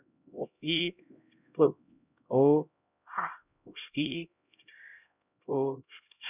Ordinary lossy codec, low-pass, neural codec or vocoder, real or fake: none; 3.6 kHz; codec, 16 kHz, 0.5 kbps, X-Codec, HuBERT features, trained on LibriSpeech; fake